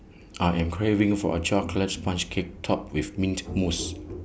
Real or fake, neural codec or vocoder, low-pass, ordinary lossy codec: real; none; none; none